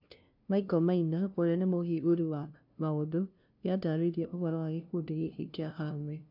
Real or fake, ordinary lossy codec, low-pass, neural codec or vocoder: fake; none; 5.4 kHz; codec, 16 kHz, 0.5 kbps, FunCodec, trained on LibriTTS, 25 frames a second